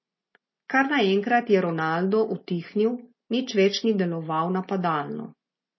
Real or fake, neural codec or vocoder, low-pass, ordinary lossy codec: real; none; 7.2 kHz; MP3, 24 kbps